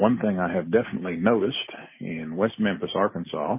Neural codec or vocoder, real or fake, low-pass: none; real; 3.6 kHz